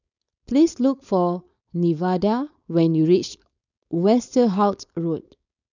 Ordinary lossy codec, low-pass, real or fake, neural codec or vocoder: none; 7.2 kHz; fake; codec, 16 kHz, 4.8 kbps, FACodec